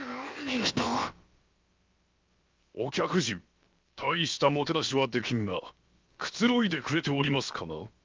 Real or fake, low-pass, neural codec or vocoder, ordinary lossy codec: fake; 7.2 kHz; codec, 16 kHz, about 1 kbps, DyCAST, with the encoder's durations; Opus, 24 kbps